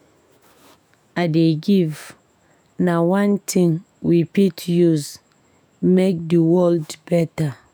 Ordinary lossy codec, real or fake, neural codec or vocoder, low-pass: none; fake; autoencoder, 48 kHz, 128 numbers a frame, DAC-VAE, trained on Japanese speech; none